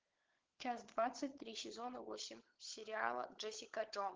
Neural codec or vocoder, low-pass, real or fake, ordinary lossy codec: codec, 16 kHz, 16 kbps, FunCodec, trained on LibriTTS, 50 frames a second; 7.2 kHz; fake; Opus, 16 kbps